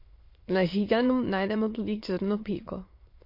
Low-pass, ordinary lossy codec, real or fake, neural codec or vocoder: 5.4 kHz; MP3, 32 kbps; fake; autoencoder, 22.05 kHz, a latent of 192 numbers a frame, VITS, trained on many speakers